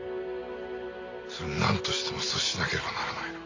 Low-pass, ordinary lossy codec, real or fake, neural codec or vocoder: 7.2 kHz; AAC, 48 kbps; real; none